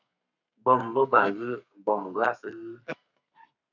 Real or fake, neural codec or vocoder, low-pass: fake; codec, 32 kHz, 1.9 kbps, SNAC; 7.2 kHz